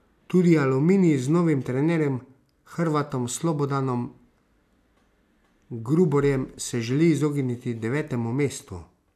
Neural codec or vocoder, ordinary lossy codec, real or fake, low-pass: none; none; real; 14.4 kHz